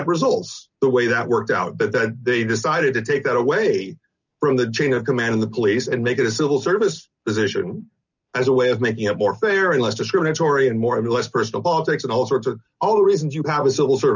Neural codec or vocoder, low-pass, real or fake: none; 7.2 kHz; real